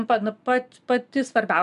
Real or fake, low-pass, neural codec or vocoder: real; 10.8 kHz; none